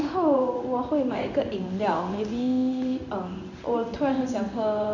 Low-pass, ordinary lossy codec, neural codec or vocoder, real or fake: 7.2 kHz; none; codec, 16 kHz in and 24 kHz out, 1 kbps, XY-Tokenizer; fake